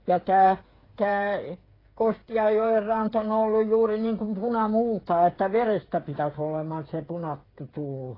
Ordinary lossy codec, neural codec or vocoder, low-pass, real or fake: AAC, 24 kbps; codec, 16 kHz, 8 kbps, FreqCodec, smaller model; 5.4 kHz; fake